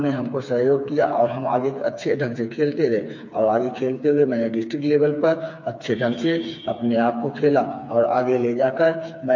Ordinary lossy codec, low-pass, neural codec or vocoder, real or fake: MP3, 48 kbps; 7.2 kHz; codec, 16 kHz, 4 kbps, FreqCodec, smaller model; fake